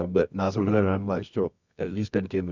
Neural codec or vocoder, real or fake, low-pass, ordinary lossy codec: codec, 24 kHz, 0.9 kbps, WavTokenizer, medium music audio release; fake; 7.2 kHz; none